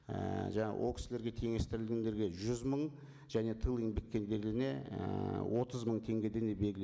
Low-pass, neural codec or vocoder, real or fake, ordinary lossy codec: none; none; real; none